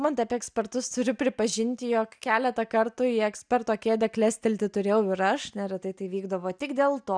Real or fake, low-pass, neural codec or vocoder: real; 9.9 kHz; none